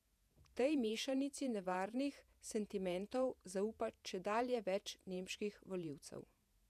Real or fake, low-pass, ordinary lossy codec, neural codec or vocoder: fake; 14.4 kHz; none; vocoder, 48 kHz, 128 mel bands, Vocos